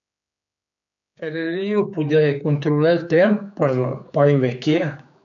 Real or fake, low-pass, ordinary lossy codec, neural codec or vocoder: fake; 7.2 kHz; none; codec, 16 kHz, 4 kbps, X-Codec, HuBERT features, trained on general audio